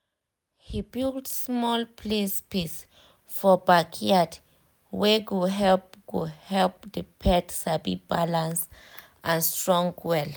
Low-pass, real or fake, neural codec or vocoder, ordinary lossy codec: none; real; none; none